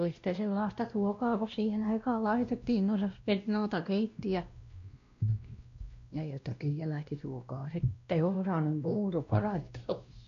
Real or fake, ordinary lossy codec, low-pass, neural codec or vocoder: fake; MP3, 48 kbps; 7.2 kHz; codec, 16 kHz, 1 kbps, X-Codec, WavLM features, trained on Multilingual LibriSpeech